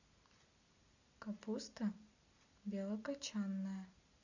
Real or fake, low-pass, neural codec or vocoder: real; 7.2 kHz; none